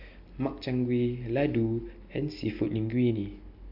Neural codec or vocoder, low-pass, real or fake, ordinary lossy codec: none; 5.4 kHz; real; AAC, 48 kbps